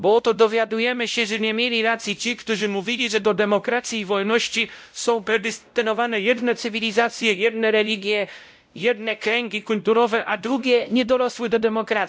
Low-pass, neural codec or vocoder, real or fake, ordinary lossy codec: none; codec, 16 kHz, 0.5 kbps, X-Codec, WavLM features, trained on Multilingual LibriSpeech; fake; none